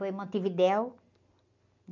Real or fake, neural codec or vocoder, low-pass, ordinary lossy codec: real; none; 7.2 kHz; none